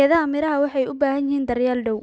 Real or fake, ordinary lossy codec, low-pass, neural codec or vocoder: real; none; none; none